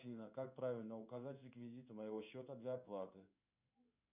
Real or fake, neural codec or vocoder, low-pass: fake; codec, 16 kHz in and 24 kHz out, 1 kbps, XY-Tokenizer; 3.6 kHz